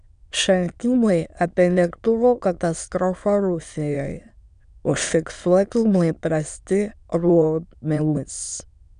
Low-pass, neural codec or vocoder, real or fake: 9.9 kHz; autoencoder, 22.05 kHz, a latent of 192 numbers a frame, VITS, trained on many speakers; fake